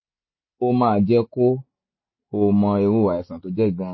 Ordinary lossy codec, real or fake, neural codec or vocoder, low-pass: MP3, 24 kbps; real; none; 7.2 kHz